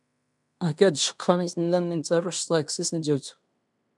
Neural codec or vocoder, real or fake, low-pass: codec, 16 kHz in and 24 kHz out, 0.9 kbps, LongCat-Audio-Codec, fine tuned four codebook decoder; fake; 10.8 kHz